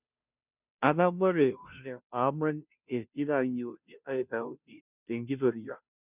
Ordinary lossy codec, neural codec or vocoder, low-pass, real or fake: none; codec, 16 kHz, 0.5 kbps, FunCodec, trained on Chinese and English, 25 frames a second; 3.6 kHz; fake